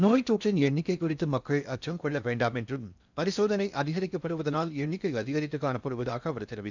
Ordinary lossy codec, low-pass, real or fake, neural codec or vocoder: none; 7.2 kHz; fake; codec, 16 kHz in and 24 kHz out, 0.6 kbps, FocalCodec, streaming, 4096 codes